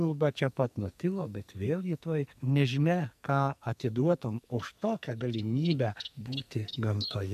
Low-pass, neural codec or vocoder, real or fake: 14.4 kHz; codec, 44.1 kHz, 2.6 kbps, SNAC; fake